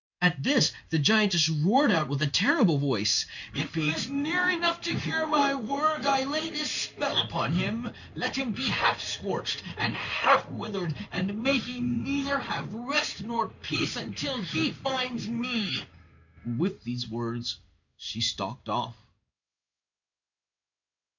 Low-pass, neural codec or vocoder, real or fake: 7.2 kHz; codec, 16 kHz in and 24 kHz out, 1 kbps, XY-Tokenizer; fake